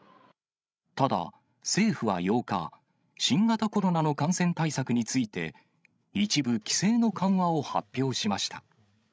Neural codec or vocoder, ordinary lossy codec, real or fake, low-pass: codec, 16 kHz, 16 kbps, FreqCodec, larger model; none; fake; none